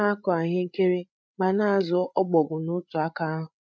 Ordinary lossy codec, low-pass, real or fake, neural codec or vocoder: none; none; fake; codec, 16 kHz, 8 kbps, FreqCodec, larger model